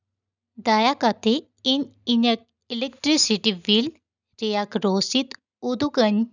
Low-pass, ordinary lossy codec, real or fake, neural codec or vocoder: 7.2 kHz; none; real; none